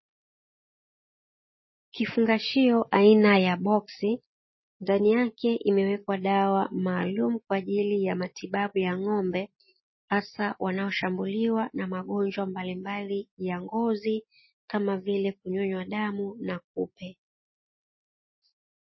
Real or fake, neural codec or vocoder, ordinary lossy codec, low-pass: real; none; MP3, 24 kbps; 7.2 kHz